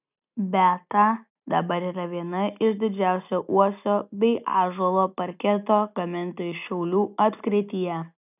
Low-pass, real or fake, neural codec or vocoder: 3.6 kHz; real; none